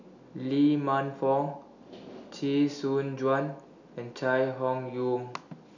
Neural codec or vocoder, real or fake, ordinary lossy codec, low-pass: none; real; none; 7.2 kHz